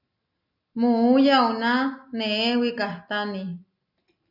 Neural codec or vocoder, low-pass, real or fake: none; 5.4 kHz; real